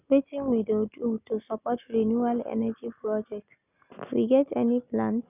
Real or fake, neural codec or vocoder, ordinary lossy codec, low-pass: real; none; none; 3.6 kHz